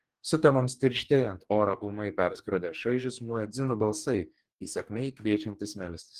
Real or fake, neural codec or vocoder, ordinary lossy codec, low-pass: fake; codec, 44.1 kHz, 2.6 kbps, DAC; Opus, 24 kbps; 14.4 kHz